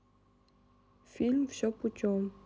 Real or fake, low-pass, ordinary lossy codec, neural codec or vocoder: real; none; none; none